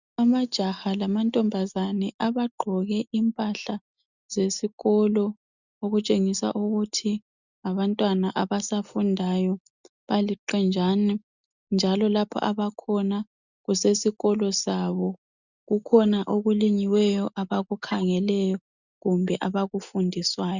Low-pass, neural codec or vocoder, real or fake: 7.2 kHz; none; real